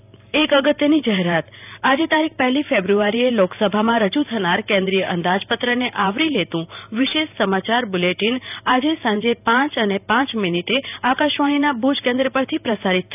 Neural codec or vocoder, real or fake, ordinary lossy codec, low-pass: vocoder, 44.1 kHz, 128 mel bands every 512 samples, BigVGAN v2; fake; none; 3.6 kHz